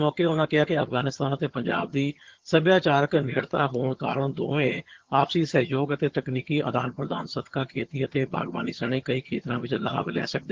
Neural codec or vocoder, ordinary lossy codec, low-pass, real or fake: vocoder, 22.05 kHz, 80 mel bands, HiFi-GAN; Opus, 16 kbps; 7.2 kHz; fake